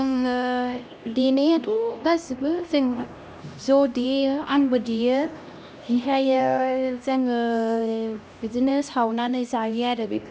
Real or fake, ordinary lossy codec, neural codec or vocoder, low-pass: fake; none; codec, 16 kHz, 1 kbps, X-Codec, HuBERT features, trained on LibriSpeech; none